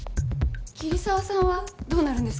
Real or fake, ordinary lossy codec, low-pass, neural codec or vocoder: real; none; none; none